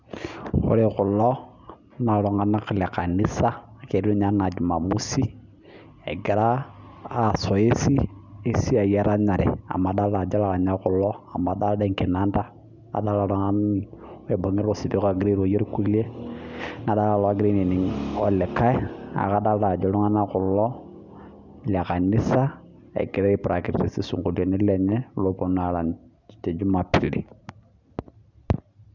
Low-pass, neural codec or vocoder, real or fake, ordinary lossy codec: 7.2 kHz; none; real; none